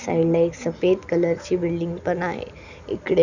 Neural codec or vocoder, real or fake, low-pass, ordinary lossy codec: none; real; 7.2 kHz; none